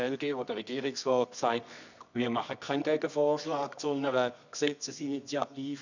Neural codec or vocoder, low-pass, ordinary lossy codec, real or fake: codec, 24 kHz, 0.9 kbps, WavTokenizer, medium music audio release; 7.2 kHz; none; fake